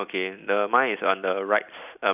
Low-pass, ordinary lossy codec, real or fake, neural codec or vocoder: 3.6 kHz; none; fake; vocoder, 44.1 kHz, 128 mel bands every 256 samples, BigVGAN v2